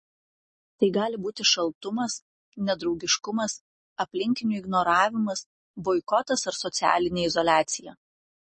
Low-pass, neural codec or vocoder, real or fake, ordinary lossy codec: 10.8 kHz; none; real; MP3, 32 kbps